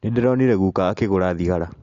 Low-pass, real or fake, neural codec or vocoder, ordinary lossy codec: 7.2 kHz; real; none; AAC, 48 kbps